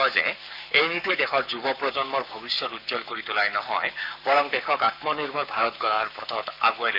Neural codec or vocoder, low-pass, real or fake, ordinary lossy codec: codec, 44.1 kHz, 7.8 kbps, Pupu-Codec; 5.4 kHz; fake; none